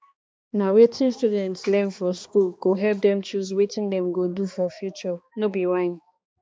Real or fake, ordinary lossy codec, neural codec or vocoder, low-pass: fake; none; codec, 16 kHz, 2 kbps, X-Codec, HuBERT features, trained on balanced general audio; none